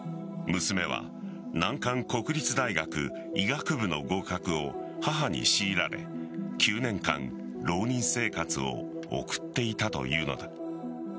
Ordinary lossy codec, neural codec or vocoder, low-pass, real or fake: none; none; none; real